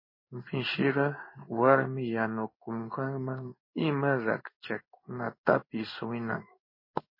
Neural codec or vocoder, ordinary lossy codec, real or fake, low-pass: codec, 16 kHz in and 24 kHz out, 1 kbps, XY-Tokenizer; MP3, 24 kbps; fake; 5.4 kHz